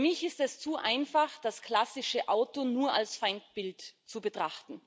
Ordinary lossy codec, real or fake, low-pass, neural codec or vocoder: none; real; none; none